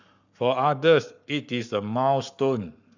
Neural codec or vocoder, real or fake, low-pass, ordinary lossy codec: codec, 44.1 kHz, 7.8 kbps, Pupu-Codec; fake; 7.2 kHz; none